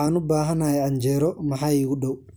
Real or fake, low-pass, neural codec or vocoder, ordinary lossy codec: real; none; none; none